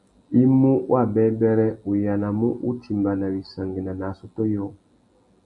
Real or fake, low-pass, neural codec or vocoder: real; 10.8 kHz; none